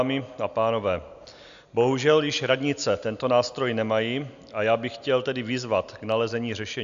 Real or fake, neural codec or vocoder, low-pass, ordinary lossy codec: real; none; 7.2 kHz; AAC, 96 kbps